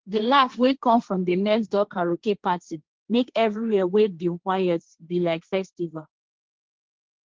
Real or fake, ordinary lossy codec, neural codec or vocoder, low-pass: fake; Opus, 16 kbps; codec, 16 kHz, 1.1 kbps, Voila-Tokenizer; 7.2 kHz